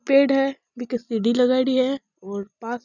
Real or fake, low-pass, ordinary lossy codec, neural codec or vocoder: real; 7.2 kHz; none; none